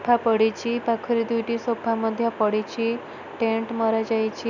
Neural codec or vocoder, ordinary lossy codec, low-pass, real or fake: none; none; 7.2 kHz; real